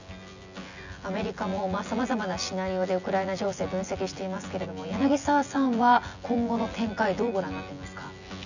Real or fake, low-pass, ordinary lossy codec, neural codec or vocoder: fake; 7.2 kHz; none; vocoder, 24 kHz, 100 mel bands, Vocos